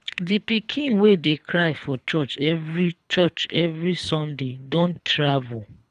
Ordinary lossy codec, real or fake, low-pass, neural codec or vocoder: none; fake; none; codec, 24 kHz, 3 kbps, HILCodec